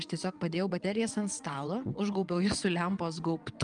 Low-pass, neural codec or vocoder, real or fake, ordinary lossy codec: 9.9 kHz; vocoder, 22.05 kHz, 80 mel bands, WaveNeXt; fake; Opus, 32 kbps